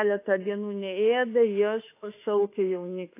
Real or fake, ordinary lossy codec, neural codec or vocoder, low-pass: fake; AAC, 24 kbps; autoencoder, 48 kHz, 32 numbers a frame, DAC-VAE, trained on Japanese speech; 3.6 kHz